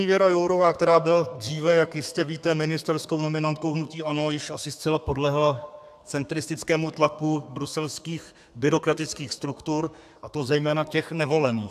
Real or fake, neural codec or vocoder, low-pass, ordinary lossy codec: fake; codec, 32 kHz, 1.9 kbps, SNAC; 14.4 kHz; AAC, 96 kbps